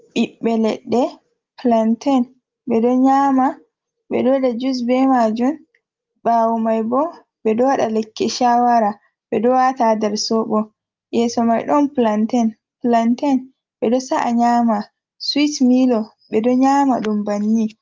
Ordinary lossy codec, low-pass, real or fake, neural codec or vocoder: Opus, 32 kbps; 7.2 kHz; real; none